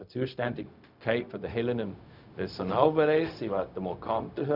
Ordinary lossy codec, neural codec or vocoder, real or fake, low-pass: none; codec, 16 kHz, 0.4 kbps, LongCat-Audio-Codec; fake; 5.4 kHz